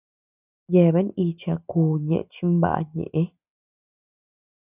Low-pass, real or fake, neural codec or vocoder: 3.6 kHz; real; none